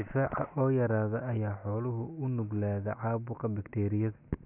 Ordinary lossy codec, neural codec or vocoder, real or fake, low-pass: none; none; real; 3.6 kHz